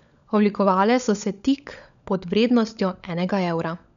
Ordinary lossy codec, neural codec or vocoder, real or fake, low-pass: none; codec, 16 kHz, 16 kbps, FunCodec, trained on LibriTTS, 50 frames a second; fake; 7.2 kHz